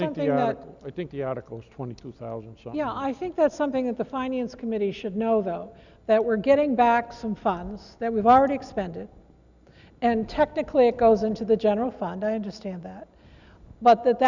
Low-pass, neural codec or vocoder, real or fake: 7.2 kHz; none; real